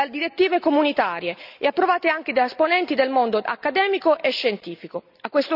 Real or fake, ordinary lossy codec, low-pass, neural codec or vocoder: real; none; 5.4 kHz; none